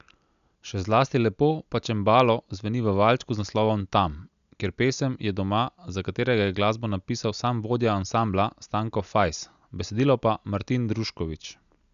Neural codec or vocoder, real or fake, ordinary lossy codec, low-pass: none; real; none; 7.2 kHz